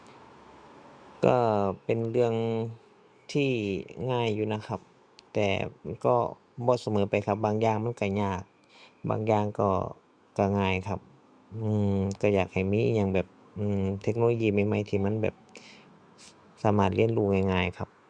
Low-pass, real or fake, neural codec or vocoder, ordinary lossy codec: 9.9 kHz; fake; autoencoder, 48 kHz, 128 numbers a frame, DAC-VAE, trained on Japanese speech; Opus, 64 kbps